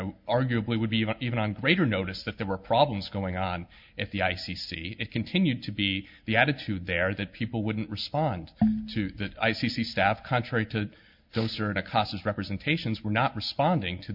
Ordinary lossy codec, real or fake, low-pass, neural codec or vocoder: MP3, 48 kbps; real; 5.4 kHz; none